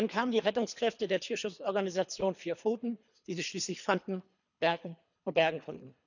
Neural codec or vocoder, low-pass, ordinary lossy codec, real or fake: codec, 24 kHz, 3 kbps, HILCodec; 7.2 kHz; none; fake